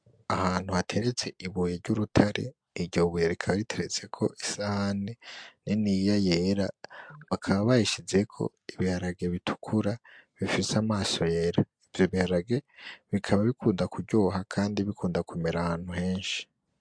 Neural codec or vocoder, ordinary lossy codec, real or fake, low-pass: none; MP3, 64 kbps; real; 9.9 kHz